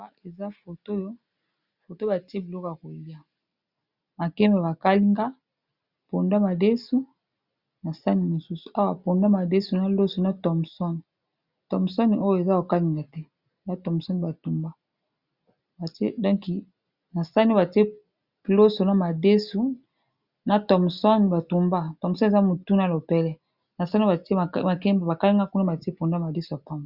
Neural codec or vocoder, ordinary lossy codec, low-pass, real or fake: none; Opus, 64 kbps; 5.4 kHz; real